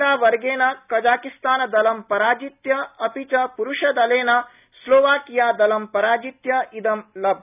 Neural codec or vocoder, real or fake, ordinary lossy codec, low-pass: none; real; none; 3.6 kHz